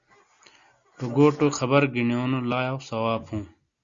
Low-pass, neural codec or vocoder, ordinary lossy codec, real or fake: 7.2 kHz; none; Opus, 64 kbps; real